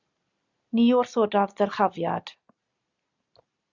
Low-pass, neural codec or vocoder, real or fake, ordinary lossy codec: 7.2 kHz; none; real; Opus, 64 kbps